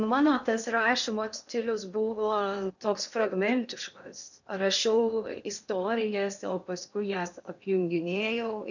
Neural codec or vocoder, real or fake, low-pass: codec, 16 kHz in and 24 kHz out, 0.8 kbps, FocalCodec, streaming, 65536 codes; fake; 7.2 kHz